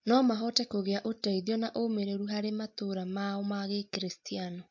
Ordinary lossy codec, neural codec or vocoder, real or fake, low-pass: MP3, 48 kbps; none; real; 7.2 kHz